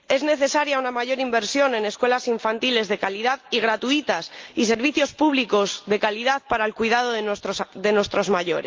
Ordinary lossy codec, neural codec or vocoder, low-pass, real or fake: Opus, 32 kbps; none; 7.2 kHz; real